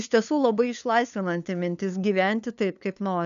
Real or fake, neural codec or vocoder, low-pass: fake; codec, 16 kHz, 2 kbps, FunCodec, trained on LibriTTS, 25 frames a second; 7.2 kHz